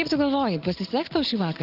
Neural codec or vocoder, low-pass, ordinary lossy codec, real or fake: none; 5.4 kHz; Opus, 16 kbps; real